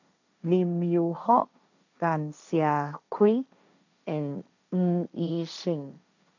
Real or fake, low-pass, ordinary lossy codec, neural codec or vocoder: fake; 7.2 kHz; none; codec, 16 kHz, 1.1 kbps, Voila-Tokenizer